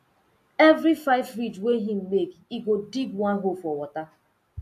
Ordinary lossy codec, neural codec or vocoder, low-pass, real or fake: AAC, 64 kbps; none; 14.4 kHz; real